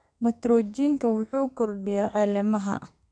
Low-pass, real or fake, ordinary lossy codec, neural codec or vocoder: 9.9 kHz; fake; MP3, 96 kbps; codec, 32 kHz, 1.9 kbps, SNAC